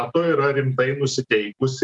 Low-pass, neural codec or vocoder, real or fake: 10.8 kHz; none; real